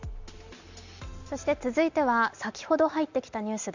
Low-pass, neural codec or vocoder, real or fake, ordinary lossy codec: 7.2 kHz; none; real; Opus, 64 kbps